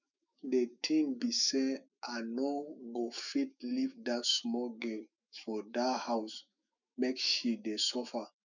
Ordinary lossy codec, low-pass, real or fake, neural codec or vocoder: none; 7.2 kHz; fake; autoencoder, 48 kHz, 128 numbers a frame, DAC-VAE, trained on Japanese speech